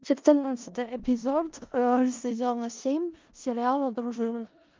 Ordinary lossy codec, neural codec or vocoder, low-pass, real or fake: Opus, 32 kbps; codec, 16 kHz in and 24 kHz out, 0.4 kbps, LongCat-Audio-Codec, four codebook decoder; 7.2 kHz; fake